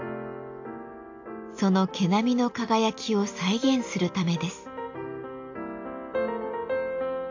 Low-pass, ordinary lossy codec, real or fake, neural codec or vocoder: 7.2 kHz; AAC, 48 kbps; real; none